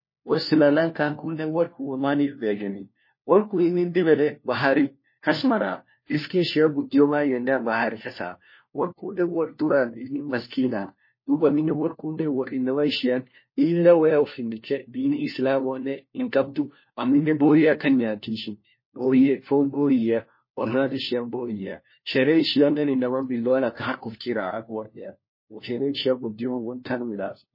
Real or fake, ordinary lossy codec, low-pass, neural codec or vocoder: fake; MP3, 24 kbps; 5.4 kHz; codec, 16 kHz, 1 kbps, FunCodec, trained on LibriTTS, 50 frames a second